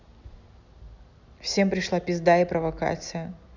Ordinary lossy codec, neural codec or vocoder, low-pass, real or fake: none; none; 7.2 kHz; real